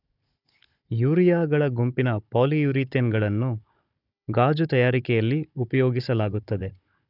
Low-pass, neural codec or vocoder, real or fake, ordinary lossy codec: 5.4 kHz; codec, 16 kHz, 16 kbps, FunCodec, trained on Chinese and English, 50 frames a second; fake; none